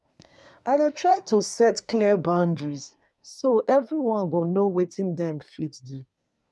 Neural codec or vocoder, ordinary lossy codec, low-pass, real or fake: codec, 24 kHz, 1 kbps, SNAC; none; none; fake